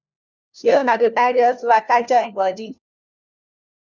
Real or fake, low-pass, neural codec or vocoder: fake; 7.2 kHz; codec, 16 kHz, 1 kbps, FunCodec, trained on LibriTTS, 50 frames a second